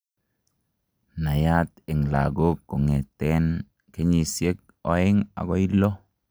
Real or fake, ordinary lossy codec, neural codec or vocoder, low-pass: real; none; none; none